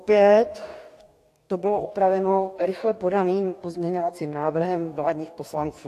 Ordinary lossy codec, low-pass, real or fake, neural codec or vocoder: AAC, 64 kbps; 14.4 kHz; fake; codec, 44.1 kHz, 2.6 kbps, DAC